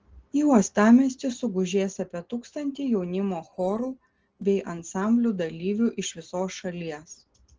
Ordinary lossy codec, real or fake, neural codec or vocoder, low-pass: Opus, 16 kbps; real; none; 7.2 kHz